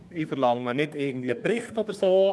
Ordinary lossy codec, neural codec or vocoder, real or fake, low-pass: none; codec, 24 kHz, 1 kbps, SNAC; fake; none